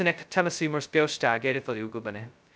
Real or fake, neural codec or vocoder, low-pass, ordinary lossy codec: fake; codec, 16 kHz, 0.2 kbps, FocalCodec; none; none